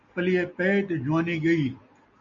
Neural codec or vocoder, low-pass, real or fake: none; 7.2 kHz; real